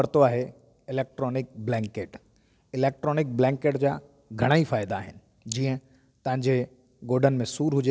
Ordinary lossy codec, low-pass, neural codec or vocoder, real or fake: none; none; none; real